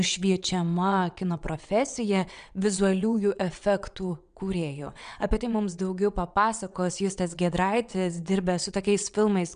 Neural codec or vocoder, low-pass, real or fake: vocoder, 22.05 kHz, 80 mel bands, WaveNeXt; 9.9 kHz; fake